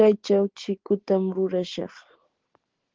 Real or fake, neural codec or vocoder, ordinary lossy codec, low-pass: real; none; Opus, 16 kbps; 7.2 kHz